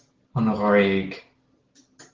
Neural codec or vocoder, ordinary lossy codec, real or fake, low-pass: none; Opus, 16 kbps; real; 7.2 kHz